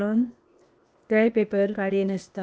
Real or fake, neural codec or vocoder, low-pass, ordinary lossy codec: fake; codec, 16 kHz, 0.8 kbps, ZipCodec; none; none